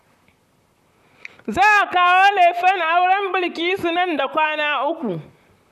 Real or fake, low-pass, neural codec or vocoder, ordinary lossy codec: fake; 14.4 kHz; vocoder, 44.1 kHz, 128 mel bands, Pupu-Vocoder; none